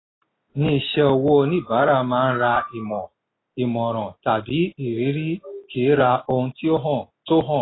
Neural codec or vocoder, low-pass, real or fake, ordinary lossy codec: none; 7.2 kHz; real; AAC, 16 kbps